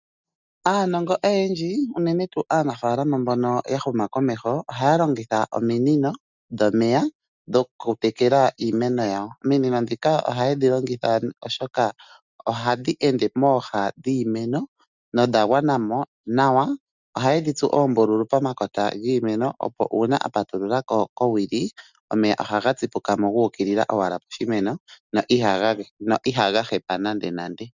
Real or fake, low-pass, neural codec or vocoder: real; 7.2 kHz; none